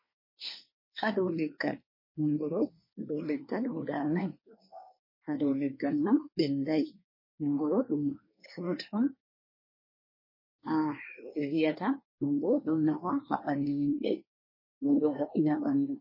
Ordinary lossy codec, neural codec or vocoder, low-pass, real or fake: MP3, 24 kbps; codec, 24 kHz, 1 kbps, SNAC; 5.4 kHz; fake